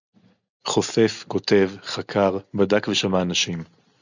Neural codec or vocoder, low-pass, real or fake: none; 7.2 kHz; real